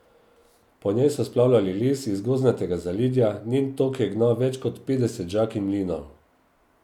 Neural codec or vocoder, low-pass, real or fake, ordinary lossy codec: none; 19.8 kHz; real; none